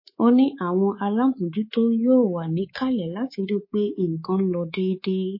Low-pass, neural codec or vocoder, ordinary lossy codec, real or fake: 5.4 kHz; codec, 24 kHz, 3.1 kbps, DualCodec; MP3, 24 kbps; fake